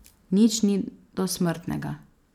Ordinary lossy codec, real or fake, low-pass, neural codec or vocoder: none; fake; 19.8 kHz; vocoder, 44.1 kHz, 128 mel bands every 512 samples, BigVGAN v2